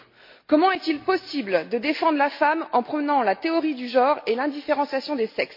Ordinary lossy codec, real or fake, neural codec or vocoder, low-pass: MP3, 32 kbps; real; none; 5.4 kHz